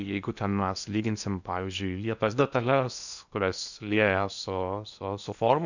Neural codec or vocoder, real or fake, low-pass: codec, 16 kHz in and 24 kHz out, 0.8 kbps, FocalCodec, streaming, 65536 codes; fake; 7.2 kHz